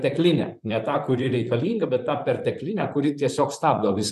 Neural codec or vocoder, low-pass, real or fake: vocoder, 44.1 kHz, 128 mel bands, Pupu-Vocoder; 14.4 kHz; fake